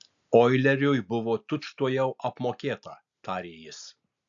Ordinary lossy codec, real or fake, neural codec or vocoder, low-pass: AAC, 64 kbps; real; none; 7.2 kHz